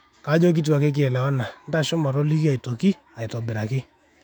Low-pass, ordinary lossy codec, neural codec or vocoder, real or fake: 19.8 kHz; none; autoencoder, 48 kHz, 128 numbers a frame, DAC-VAE, trained on Japanese speech; fake